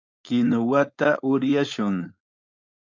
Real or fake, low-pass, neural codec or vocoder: fake; 7.2 kHz; codec, 16 kHz, 4.8 kbps, FACodec